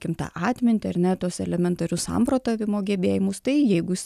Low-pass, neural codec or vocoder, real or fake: 14.4 kHz; none; real